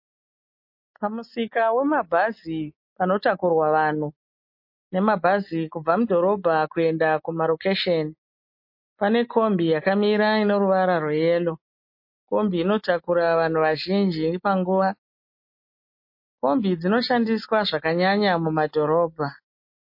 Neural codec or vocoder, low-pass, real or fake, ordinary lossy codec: none; 5.4 kHz; real; MP3, 32 kbps